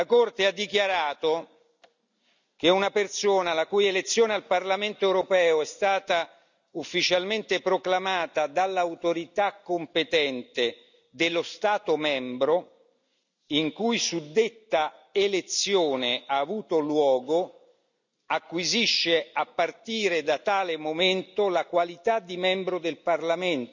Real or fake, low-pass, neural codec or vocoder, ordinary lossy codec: real; 7.2 kHz; none; none